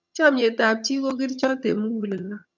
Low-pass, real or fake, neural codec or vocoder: 7.2 kHz; fake; vocoder, 22.05 kHz, 80 mel bands, HiFi-GAN